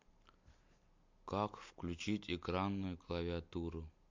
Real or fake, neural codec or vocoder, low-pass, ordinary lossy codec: real; none; 7.2 kHz; MP3, 48 kbps